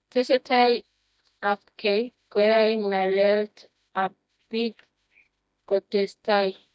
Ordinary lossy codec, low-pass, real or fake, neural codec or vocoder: none; none; fake; codec, 16 kHz, 1 kbps, FreqCodec, smaller model